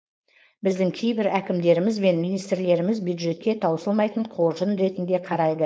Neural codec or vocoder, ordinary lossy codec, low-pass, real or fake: codec, 16 kHz, 4.8 kbps, FACodec; none; none; fake